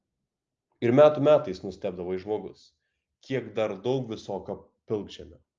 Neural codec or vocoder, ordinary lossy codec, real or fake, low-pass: none; Opus, 24 kbps; real; 7.2 kHz